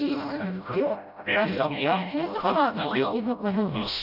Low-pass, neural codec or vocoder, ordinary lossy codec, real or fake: 5.4 kHz; codec, 16 kHz, 0.5 kbps, FreqCodec, smaller model; none; fake